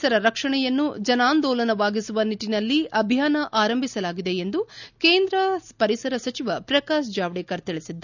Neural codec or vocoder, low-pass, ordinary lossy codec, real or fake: none; 7.2 kHz; none; real